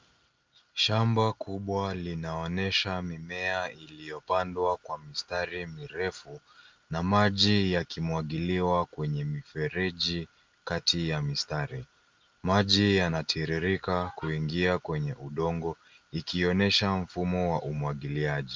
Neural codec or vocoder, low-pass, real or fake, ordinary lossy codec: none; 7.2 kHz; real; Opus, 24 kbps